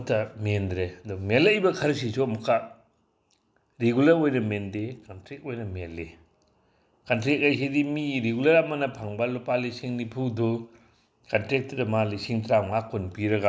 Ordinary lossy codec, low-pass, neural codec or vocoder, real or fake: none; none; none; real